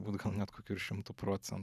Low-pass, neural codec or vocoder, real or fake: 14.4 kHz; vocoder, 44.1 kHz, 128 mel bands every 512 samples, BigVGAN v2; fake